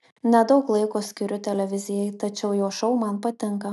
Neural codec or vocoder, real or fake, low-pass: none; real; 14.4 kHz